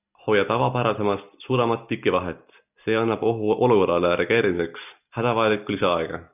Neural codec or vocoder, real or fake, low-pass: none; real; 3.6 kHz